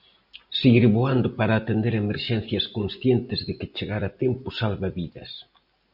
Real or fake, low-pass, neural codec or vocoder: real; 5.4 kHz; none